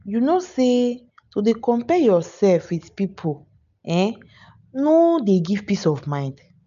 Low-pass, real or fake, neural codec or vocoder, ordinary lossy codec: 7.2 kHz; real; none; none